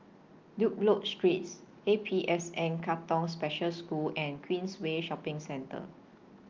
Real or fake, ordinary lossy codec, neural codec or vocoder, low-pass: real; Opus, 32 kbps; none; 7.2 kHz